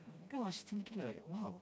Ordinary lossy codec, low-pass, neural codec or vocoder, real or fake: none; none; codec, 16 kHz, 1 kbps, FreqCodec, smaller model; fake